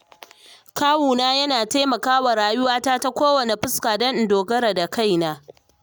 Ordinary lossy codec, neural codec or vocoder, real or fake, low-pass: none; none; real; none